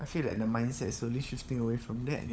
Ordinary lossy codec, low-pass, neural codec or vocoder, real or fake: none; none; codec, 16 kHz, 16 kbps, FunCodec, trained on LibriTTS, 50 frames a second; fake